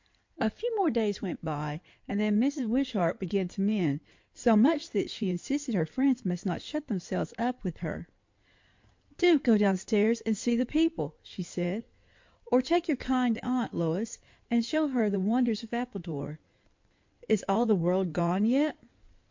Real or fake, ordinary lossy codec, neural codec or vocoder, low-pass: fake; MP3, 48 kbps; codec, 16 kHz in and 24 kHz out, 2.2 kbps, FireRedTTS-2 codec; 7.2 kHz